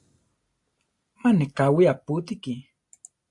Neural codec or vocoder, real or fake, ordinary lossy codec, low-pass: none; real; AAC, 64 kbps; 10.8 kHz